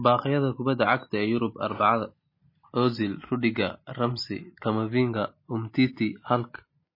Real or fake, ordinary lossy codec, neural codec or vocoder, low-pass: real; MP3, 24 kbps; none; 5.4 kHz